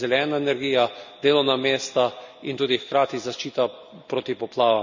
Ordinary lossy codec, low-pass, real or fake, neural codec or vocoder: none; 7.2 kHz; real; none